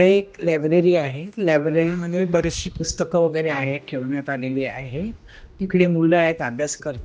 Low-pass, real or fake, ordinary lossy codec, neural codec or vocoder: none; fake; none; codec, 16 kHz, 1 kbps, X-Codec, HuBERT features, trained on general audio